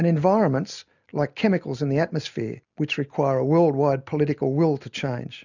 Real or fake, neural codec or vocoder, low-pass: real; none; 7.2 kHz